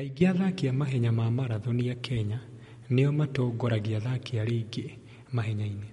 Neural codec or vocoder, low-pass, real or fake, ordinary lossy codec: none; 14.4 kHz; real; MP3, 48 kbps